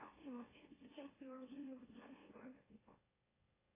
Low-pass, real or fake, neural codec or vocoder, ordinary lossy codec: 3.6 kHz; fake; autoencoder, 44.1 kHz, a latent of 192 numbers a frame, MeloTTS; AAC, 16 kbps